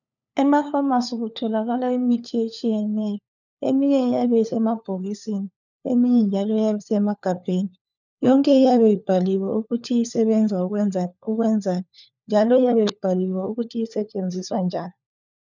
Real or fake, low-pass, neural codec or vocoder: fake; 7.2 kHz; codec, 16 kHz, 4 kbps, FunCodec, trained on LibriTTS, 50 frames a second